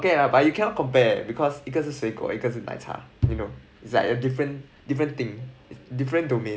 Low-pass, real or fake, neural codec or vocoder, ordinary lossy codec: none; real; none; none